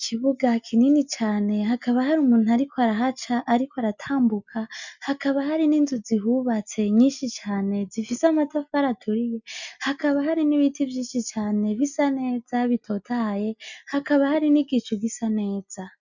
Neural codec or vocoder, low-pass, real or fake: none; 7.2 kHz; real